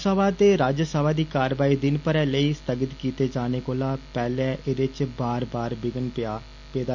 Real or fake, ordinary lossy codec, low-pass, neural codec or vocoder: real; none; 7.2 kHz; none